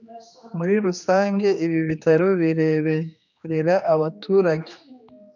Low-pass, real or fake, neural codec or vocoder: 7.2 kHz; fake; codec, 16 kHz, 2 kbps, X-Codec, HuBERT features, trained on general audio